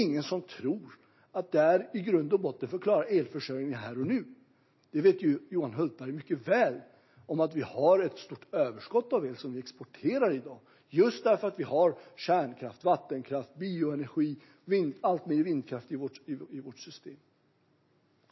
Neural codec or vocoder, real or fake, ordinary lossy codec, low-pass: none; real; MP3, 24 kbps; 7.2 kHz